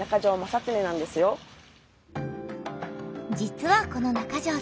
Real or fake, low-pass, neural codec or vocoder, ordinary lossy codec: real; none; none; none